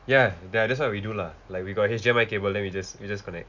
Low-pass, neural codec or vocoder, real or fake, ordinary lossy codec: 7.2 kHz; none; real; none